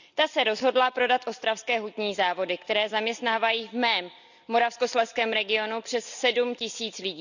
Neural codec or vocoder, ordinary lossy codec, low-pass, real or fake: none; none; 7.2 kHz; real